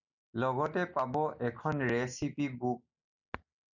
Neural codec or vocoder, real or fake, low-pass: none; real; 7.2 kHz